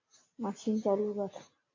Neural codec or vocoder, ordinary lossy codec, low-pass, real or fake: none; MP3, 48 kbps; 7.2 kHz; real